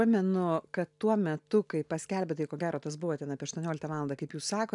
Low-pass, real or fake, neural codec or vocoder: 10.8 kHz; real; none